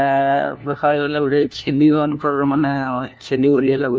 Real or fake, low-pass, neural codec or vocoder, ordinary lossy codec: fake; none; codec, 16 kHz, 1 kbps, FunCodec, trained on LibriTTS, 50 frames a second; none